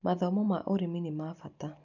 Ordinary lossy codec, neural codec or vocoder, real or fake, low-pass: none; none; real; 7.2 kHz